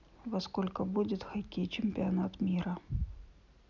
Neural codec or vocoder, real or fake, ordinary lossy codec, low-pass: none; real; none; 7.2 kHz